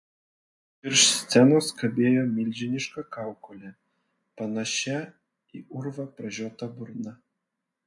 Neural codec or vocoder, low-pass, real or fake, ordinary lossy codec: none; 10.8 kHz; real; MP3, 48 kbps